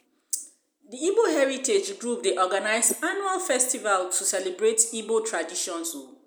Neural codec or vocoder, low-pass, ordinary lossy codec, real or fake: none; none; none; real